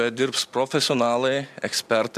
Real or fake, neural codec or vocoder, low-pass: real; none; 14.4 kHz